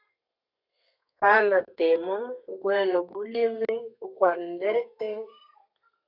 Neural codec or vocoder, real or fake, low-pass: codec, 32 kHz, 1.9 kbps, SNAC; fake; 5.4 kHz